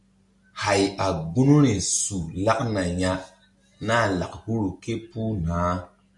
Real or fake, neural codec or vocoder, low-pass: real; none; 10.8 kHz